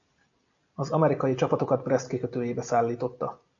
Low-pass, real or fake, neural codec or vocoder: 7.2 kHz; real; none